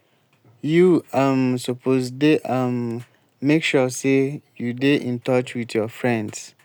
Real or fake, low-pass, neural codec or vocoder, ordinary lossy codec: real; none; none; none